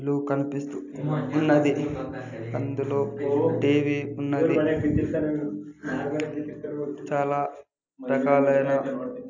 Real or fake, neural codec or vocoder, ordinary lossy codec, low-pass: real; none; none; 7.2 kHz